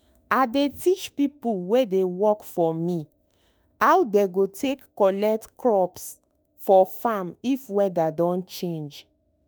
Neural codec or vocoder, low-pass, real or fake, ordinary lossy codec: autoencoder, 48 kHz, 32 numbers a frame, DAC-VAE, trained on Japanese speech; none; fake; none